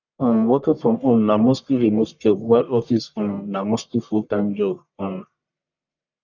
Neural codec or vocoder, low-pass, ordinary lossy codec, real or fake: codec, 44.1 kHz, 1.7 kbps, Pupu-Codec; 7.2 kHz; none; fake